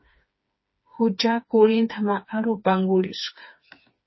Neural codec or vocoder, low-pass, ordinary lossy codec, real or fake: codec, 16 kHz, 4 kbps, FreqCodec, smaller model; 7.2 kHz; MP3, 24 kbps; fake